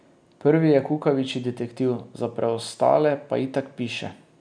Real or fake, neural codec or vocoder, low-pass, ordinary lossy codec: real; none; 9.9 kHz; none